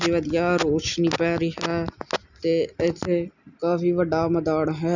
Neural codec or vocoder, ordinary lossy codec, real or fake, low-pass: none; none; real; 7.2 kHz